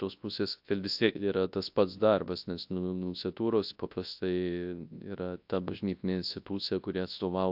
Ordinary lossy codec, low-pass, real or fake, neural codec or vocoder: AAC, 48 kbps; 5.4 kHz; fake; codec, 24 kHz, 0.9 kbps, WavTokenizer, large speech release